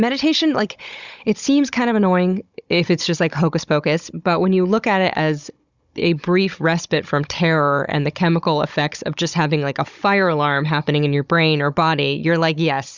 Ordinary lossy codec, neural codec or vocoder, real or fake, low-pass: Opus, 64 kbps; codec, 16 kHz, 16 kbps, FunCodec, trained on Chinese and English, 50 frames a second; fake; 7.2 kHz